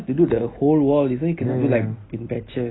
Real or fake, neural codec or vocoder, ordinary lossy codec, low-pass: real; none; AAC, 16 kbps; 7.2 kHz